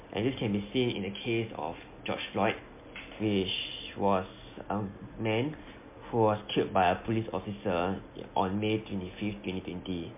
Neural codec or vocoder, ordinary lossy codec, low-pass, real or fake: none; MP3, 24 kbps; 3.6 kHz; real